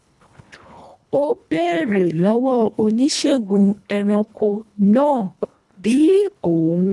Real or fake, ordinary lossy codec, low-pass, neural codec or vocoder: fake; none; none; codec, 24 kHz, 1.5 kbps, HILCodec